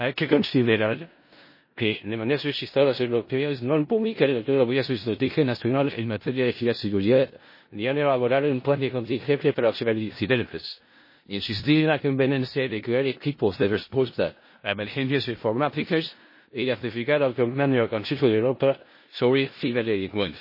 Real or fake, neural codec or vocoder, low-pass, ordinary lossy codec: fake; codec, 16 kHz in and 24 kHz out, 0.4 kbps, LongCat-Audio-Codec, four codebook decoder; 5.4 kHz; MP3, 24 kbps